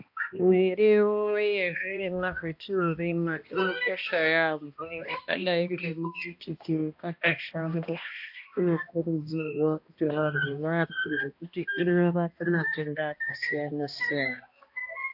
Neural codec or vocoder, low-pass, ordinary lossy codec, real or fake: codec, 16 kHz, 1 kbps, X-Codec, HuBERT features, trained on balanced general audio; 5.4 kHz; AAC, 48 kbps; fake